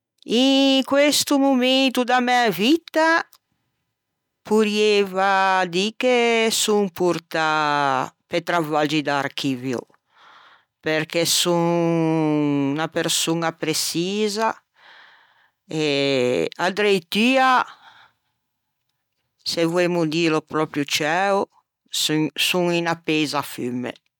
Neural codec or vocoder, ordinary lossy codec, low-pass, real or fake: none; none; 19.8 kHz; real